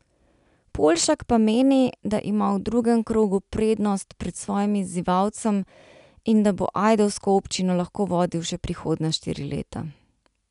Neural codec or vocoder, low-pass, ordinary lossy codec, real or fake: none; 10.8 kHz; none; real